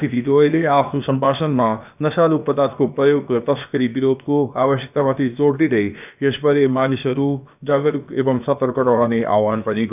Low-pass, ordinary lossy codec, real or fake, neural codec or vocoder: 3.6 kHz; none; fake; codec, 16 kHz, about 1 kbps, DyCAST, with the encoder's durations